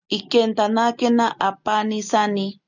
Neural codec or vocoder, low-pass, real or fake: none; 7.2 kHz; real